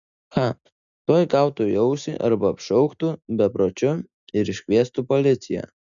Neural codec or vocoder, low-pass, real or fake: none; 7.2 kHz; real